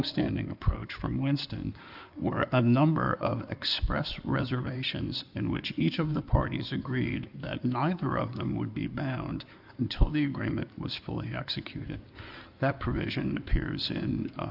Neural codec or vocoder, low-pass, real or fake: codec, 16 kHz in and 24 kHz out, 2.2 kbps, FireRedTTS-2 codec; 5.4 kHz; fake